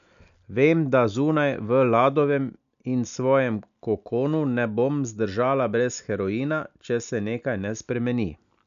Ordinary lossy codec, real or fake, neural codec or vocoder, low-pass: none; real; none; 7.2 kHz